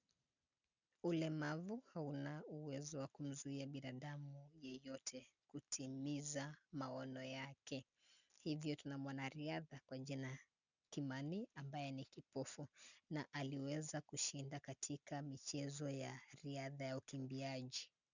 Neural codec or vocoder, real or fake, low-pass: none; real; 7.2 kHz